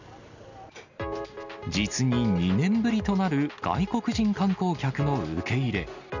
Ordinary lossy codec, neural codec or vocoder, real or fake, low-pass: none; none; real; 7.2 kHz